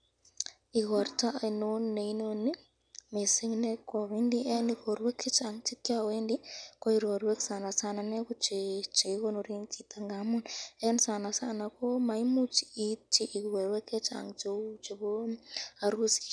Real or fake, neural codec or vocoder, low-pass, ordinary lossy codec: real; none; 9.9 kHz; none